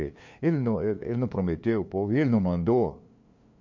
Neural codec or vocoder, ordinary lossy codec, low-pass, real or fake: codec, 16 kHz, 2 kbps, FunCodec, trained on LibriTTS, 25 frames a second; MP3, 48 kbps; 7.2 kHz; fake